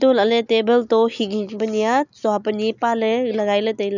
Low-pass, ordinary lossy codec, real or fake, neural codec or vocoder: 7.2 kHz; none; real; none